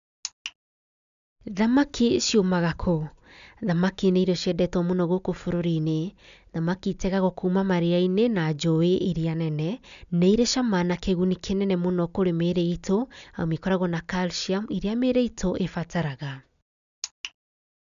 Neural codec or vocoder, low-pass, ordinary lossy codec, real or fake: none; 7.2 kHz; none; real